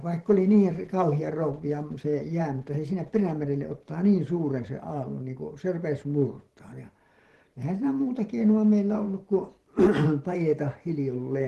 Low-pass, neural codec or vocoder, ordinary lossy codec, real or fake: 14.4 kHz; vocoder, 44.1 kHz, 128 mel bands every 512 samples, BigVGAN v2; Opus, 16 kbps; fake